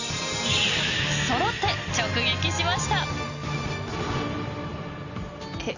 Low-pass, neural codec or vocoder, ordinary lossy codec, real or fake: 7.2 kHz; none; none; real